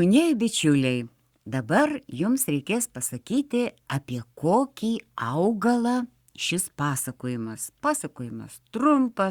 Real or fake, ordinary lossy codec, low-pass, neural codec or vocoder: fake; Opus, 64 kbps; 19.8 kHz; codec, 44.1 kHz, 7.8 kbps, Pupu-Codec